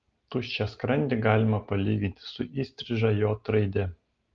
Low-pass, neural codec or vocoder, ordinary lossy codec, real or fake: 7.2 kHz; none; Opus, 32 kbps; real